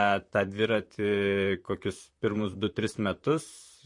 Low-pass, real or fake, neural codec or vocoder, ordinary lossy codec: 10.8 kHz; fake; vocoder, 44.1 kHz, 128 mel bands, Pupu-Vocoder; MP3, 48 kbps